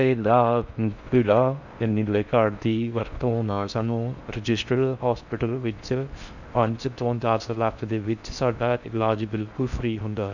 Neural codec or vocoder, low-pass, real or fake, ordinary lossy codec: codec, 16 kHz in and 24 kHz out, 0.6 kbps, FocalCodec, streaming, 4096 codes; 7.2 kHz; fake; none